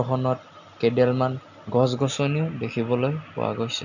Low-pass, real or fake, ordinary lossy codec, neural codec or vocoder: 7.2 kHz; real; none; none